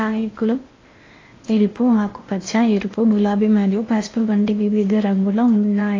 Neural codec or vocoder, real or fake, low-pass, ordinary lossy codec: codec, 16 kHz in and 24 kHz out, 0.8 kbps, FocalCodec, streaming, 65536 codes; fake; 7.2 kHz; AAC, 32 kbps